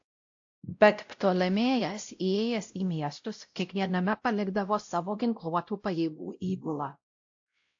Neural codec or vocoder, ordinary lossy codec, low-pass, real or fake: codec, 16 kHz, 0.5 kbps, X-Codec, WavLM features, trained on Multilingual LibriSpeech; AAC, 48 kbps; 7.2 kHz; fake